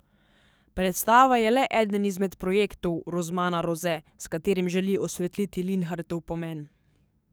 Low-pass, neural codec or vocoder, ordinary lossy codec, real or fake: none; codec, 44.1 kHz, 7.8 kbps, DAC; none; fake